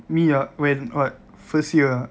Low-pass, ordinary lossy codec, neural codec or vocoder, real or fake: none; none; none; real